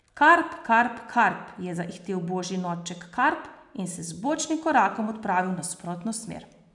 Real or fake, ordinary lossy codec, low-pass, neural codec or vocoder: real; none; 10.8 kHz; none